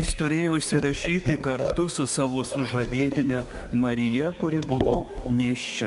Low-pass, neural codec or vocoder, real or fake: 10.8 kHz; codec, 24 kHz, 1 kbps, SNAC; fake